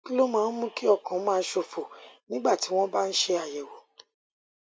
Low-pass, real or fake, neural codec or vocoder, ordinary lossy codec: none; real; none; none